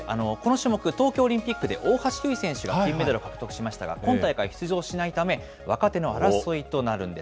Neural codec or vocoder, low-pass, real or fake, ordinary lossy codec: none; none; real; none